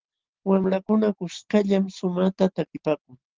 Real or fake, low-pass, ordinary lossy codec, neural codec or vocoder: real; 7.2 kHz; Opus, 16 kbps; none